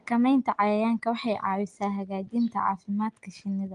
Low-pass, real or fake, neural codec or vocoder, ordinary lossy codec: 9.9 kHz; real; none; Opus, 24 kbps